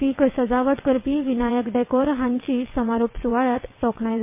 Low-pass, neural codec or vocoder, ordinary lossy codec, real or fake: 3.6 kHz; vocoder, 22.05 kHz, 80 mel bands, WaveNeXt; MP3, 24 kbps; fake